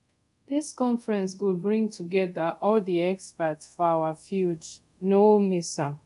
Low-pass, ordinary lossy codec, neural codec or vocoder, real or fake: 10.8 kHz; none; codec, 24 kHz, 0.5 kbps, DualCodec; fake